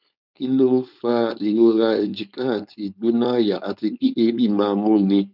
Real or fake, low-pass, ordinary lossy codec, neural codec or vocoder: fake; 5.4 kHz; none; codec, 16 kHz, 4.8 kbps, FACodec